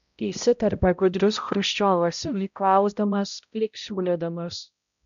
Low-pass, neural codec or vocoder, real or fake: 7.2 kHz; codec, 16 kHz, 0.5 kbps, X-Codec, HuBERT features, trained on balanced general audio; fake